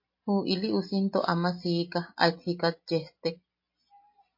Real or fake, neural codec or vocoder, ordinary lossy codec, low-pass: real; none; MP3, 32 kbps; 5.4 kHz